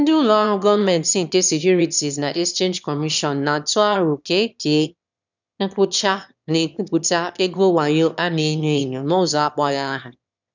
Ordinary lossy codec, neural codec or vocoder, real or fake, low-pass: none; autoencoder, 22.05 kHz, a latent of 192 numbers a frame, VITS, trained on one speaker; fake; 7.2 kHz